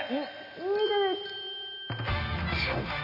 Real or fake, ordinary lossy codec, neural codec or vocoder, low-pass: real; none; none; 5.4 kHz